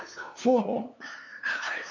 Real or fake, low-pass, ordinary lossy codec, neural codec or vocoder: fake; 7.2 kHz; MP3, 64 kbps; codec, 16 kHz, 2 kbps, FunCodec, trained on LibriTTS, 25 frames a second